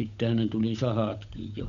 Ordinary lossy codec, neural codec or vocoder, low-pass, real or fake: none; codec, 16 kHz, 8 kbps, FunCodec, trained on Chinese and English, 25 frames a second; 7.2 kHz; fake